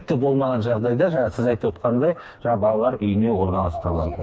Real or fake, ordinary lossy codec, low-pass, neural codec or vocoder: fake; none; none; codec, 16 kHz, 2 kbps, FreqCodec, smaller model